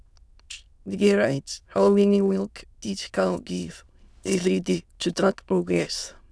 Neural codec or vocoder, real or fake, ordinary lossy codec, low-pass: autoencoder, 22.05 kHz, a latent of 192 numbers a frame, VITS, trained on many speakers; fake; none; none